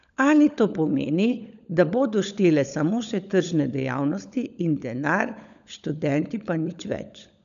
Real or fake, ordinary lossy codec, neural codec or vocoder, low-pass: fake; none; codec, 16 kHz, 16 kbps, FunCodec, trained on LibriTTS, 50 frames a second; 7.2 kHz